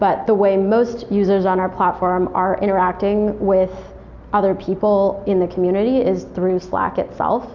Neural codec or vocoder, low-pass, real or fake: none; 7.2 kHz; real